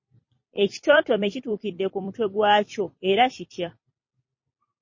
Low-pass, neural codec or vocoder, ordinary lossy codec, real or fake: 7.2 kHz; vocoder, 44.1 kHz, 128 mel bands every 256 samples, BigVGAN v2; MP3, 32 kbps; fake